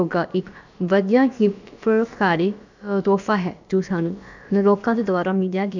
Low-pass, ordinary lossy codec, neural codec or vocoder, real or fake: 7.2 kHz; none; codec, 16 kHz, about 1 kbps, DyCAST, with the encoder's durations; fake